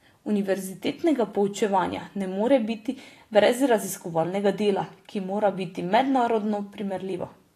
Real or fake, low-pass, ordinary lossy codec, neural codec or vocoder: fake; 14.4 kHz; AAC, 48 kbps; vocoder, 44.1 kHz, 128 mel bands every 256 samples, BigVGAN v2